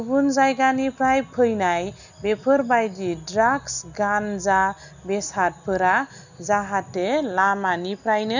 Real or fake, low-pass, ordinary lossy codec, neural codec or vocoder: real; 7.2 kHz; none; none